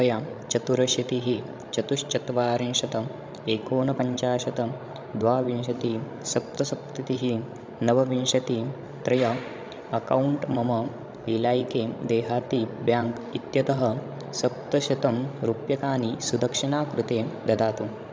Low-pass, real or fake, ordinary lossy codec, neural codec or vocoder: none; fake; none; codec, 16 kHz, 16 kbps, FreqCodec, larger model